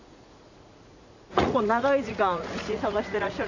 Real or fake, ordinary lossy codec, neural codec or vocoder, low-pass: fake; none; vocoder, 44.1 kHz, 80 mel bands, Vocos; 7.2 kHz